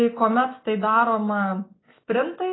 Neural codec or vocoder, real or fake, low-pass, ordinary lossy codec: none; real; 7.2 kHz; AAC, 16 kbps